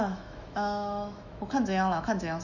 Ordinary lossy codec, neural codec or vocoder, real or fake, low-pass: none; autoencoder, 48 kHz, 128 numbers a frame, DAC-VAE, trained on Japanese speech; fake; 7.2 kHz